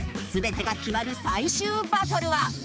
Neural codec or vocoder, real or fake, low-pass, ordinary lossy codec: codec, 16 kHz, 4 kbps, X-Codec, HuBERT features, trained on balanced general audio; fake; none; none